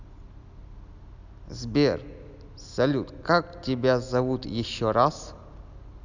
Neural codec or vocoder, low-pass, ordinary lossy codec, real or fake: none; 7.2 kHz; none; real